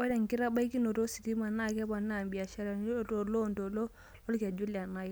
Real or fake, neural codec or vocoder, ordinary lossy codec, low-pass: real; none; none; none